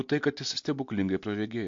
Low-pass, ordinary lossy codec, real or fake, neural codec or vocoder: 7.2 kHz; MP3, 48 kbps; real; none